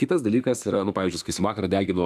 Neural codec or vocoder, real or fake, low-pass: autoencoder, 48 kHz, 32 numbers a frame, DAC-VAE, trained on Japanese speech; fake; 14.4 kHz